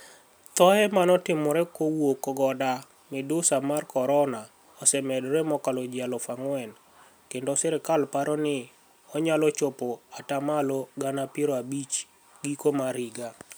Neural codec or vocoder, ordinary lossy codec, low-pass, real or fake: none; none; none; real